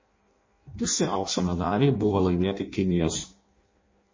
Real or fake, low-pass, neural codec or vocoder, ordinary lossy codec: fake; 7.2 kHz; codec, 16 kHz in and 24 kHz out, 0.6 kbps, FireRedTTS-2 codec; MP3, 32 kbps